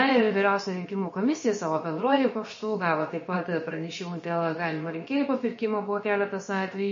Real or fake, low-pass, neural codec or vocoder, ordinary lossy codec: fake; 7.2 kHz; codec, 16 kHz, about 1 kbps, DyCAST, with the encoder's durations; MP3, 32 kbps